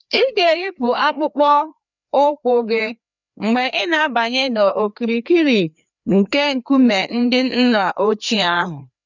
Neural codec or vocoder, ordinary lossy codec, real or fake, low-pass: codec, 16 kHz, 2 kbps, FreqCodec, larger model; none; fake; 7.2 kHz